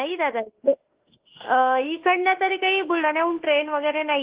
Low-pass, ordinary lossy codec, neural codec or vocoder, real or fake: 3.6 kHz; Opus, 16 kbps; autoencoder, 48 kHz, 32 numbers a frame, DAC-VAE, trained on Japanese speech; fake